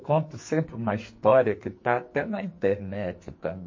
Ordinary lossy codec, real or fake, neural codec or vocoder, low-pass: MP3, 32 kbps; fake; codec, 44.1 kHz, 2.6 kbps, SNAC; 7.2 kHz